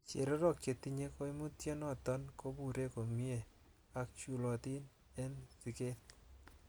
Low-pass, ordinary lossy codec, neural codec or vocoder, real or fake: none; none; none; real